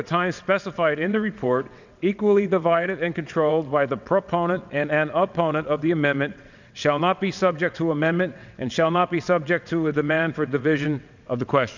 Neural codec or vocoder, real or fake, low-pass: vocoder, 22.05 kHz, 80 mel bands, WaveNeXt; fake; 7.2 kHz